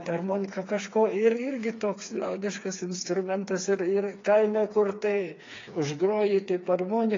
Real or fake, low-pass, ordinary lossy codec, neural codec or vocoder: fake; 7.2 kHz; AAC, 32 kbps; codec, 16 kHz, 4 kbps, FreqCodec, smaller model